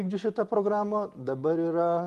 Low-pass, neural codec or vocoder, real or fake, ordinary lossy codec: 14.4 kHz; none; real; Opus, 24 kbps